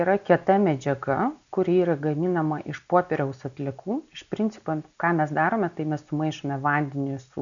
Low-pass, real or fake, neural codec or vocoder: 7.2 kHz; real; none